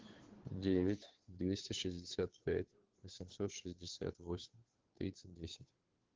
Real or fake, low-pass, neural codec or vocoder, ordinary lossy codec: fake; 7.2 kHz; codec, 16 kHz, 2 kbps, FunCodec, trained on Chinese and English, 25 frames a second; Opus, 16 kbps